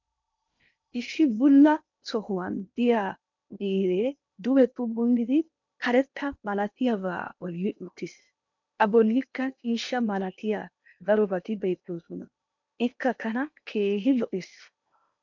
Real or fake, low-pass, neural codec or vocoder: fake; 7.2 kHz; codec, 16 kHz in and 24 kHz out, 0.8 kbps, FocalCodec, streaming, 65536 codes